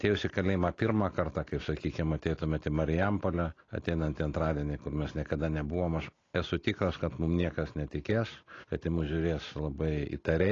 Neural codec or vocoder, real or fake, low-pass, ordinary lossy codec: none; real; 7.2 kHz; AAC, 32 kbps